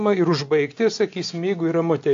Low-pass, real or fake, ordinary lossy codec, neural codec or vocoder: 7.2 kHz; real; AAC, 48 kbps; none